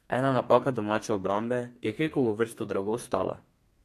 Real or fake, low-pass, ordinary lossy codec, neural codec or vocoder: fake; 14.4 kHz; AAC, 64 kbps; codec, 32 kHz, 1.9 kbps, SNAC